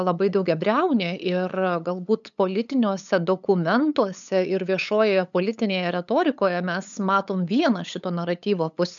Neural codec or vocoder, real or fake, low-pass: codec, 16 kHz, 8 kbps, FunCodec, trained on LibriTTS, 25 frames a second; fake; 7.2 kHz